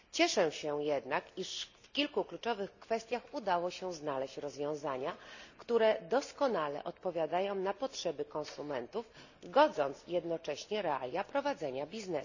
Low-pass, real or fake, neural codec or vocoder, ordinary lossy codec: 7.2 kHz; real; none; none